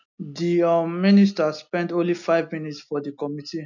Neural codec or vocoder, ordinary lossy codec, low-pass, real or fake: none; none; 7.2 kHz; real